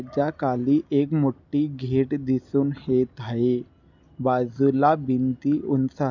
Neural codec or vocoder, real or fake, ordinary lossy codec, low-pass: none; real; none; 7.2 kHz